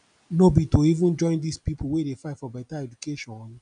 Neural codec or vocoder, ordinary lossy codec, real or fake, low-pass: none; none; real; 9.9 kHz